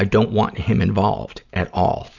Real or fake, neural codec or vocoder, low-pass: real; none; 7.2 kHz